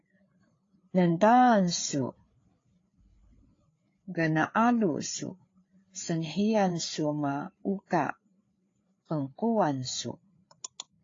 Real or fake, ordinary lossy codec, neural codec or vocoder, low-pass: fake; AAC, 32 kbps; codec, 16 kHz, 4 kbps, FreqCodec, larger model; 7.2 kHz